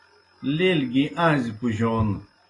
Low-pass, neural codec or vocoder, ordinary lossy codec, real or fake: 10.8 kHz; none; AAC, 32 kbps; real